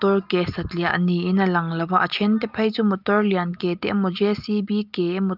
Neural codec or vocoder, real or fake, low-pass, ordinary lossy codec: none; real; 5.4 kHz; Opus, 32 kbps